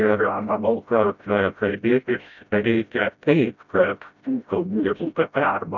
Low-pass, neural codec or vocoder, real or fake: 7.2 kHz; codec, 16 kHz, 0.5 kbps, FreqCodec, smaller model; fake